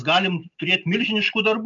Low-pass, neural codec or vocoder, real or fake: 7.2 kHz; none; real